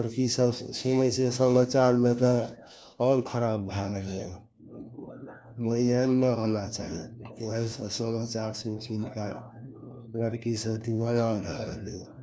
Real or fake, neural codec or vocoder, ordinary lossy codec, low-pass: fake; codec, 16 kHz, 1 kbps, FunCodec, trained on LibriTTS, 50 frames a second; none; none